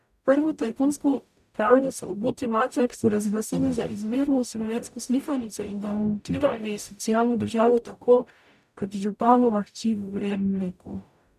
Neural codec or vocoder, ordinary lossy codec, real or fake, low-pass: codec, 44.1 kHz, 0.9 kbps, DAC; none; fake; 14.4 kHz